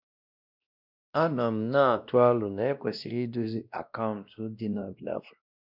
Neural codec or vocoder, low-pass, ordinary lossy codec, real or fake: codec, 16 kHz, 1 kbps, X-Codec, WavLM features, trained on Multilingual LibriSpeech; 5.4 kHz; none; fake